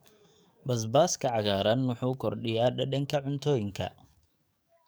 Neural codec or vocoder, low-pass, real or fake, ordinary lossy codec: codec, 44.1 kHz, 7.8 kbps, DAC; none; fake; none